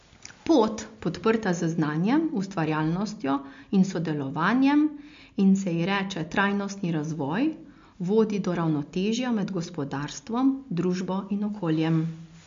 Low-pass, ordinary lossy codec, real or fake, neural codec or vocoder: 7.2 kHz; MP3, 48 kbps; real; none